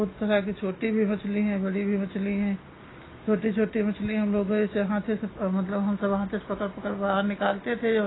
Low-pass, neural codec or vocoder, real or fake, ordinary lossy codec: 7.2 kHz; none; real; AAC, 16 kbps